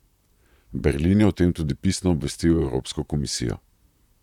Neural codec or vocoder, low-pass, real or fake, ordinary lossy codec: vocoder, 44.1 kHz, 128 mel bands, Pupu-Vocoder; 19.8 kHz; fake; none